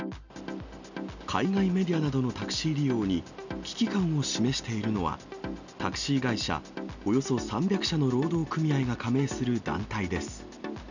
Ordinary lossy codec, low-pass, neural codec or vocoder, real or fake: none; 7.2 kHz; none; real